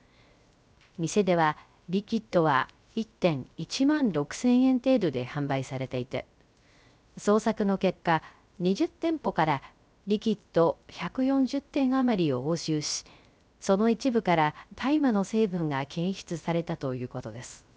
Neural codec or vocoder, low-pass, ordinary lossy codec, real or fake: codec, 16 kHz, 0.3 kbps, FocalCodec; none; none; fake